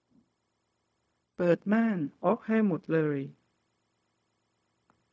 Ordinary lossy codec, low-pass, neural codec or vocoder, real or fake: none; none; codec, 16 kHz, 0.4 kbps, LongCat-Audio-Codec; fake